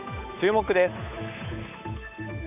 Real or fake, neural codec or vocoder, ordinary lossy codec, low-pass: fake; codec, 16 kHz, 8 kbps, FunCodec, trained on Chinese and English, 25 frames a second; none; 3.6 kHz